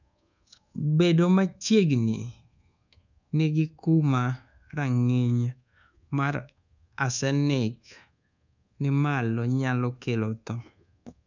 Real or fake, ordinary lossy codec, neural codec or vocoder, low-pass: fake; none; codec, 24 kHz, 1.2 kbps, DualCodec; 7.2 kHz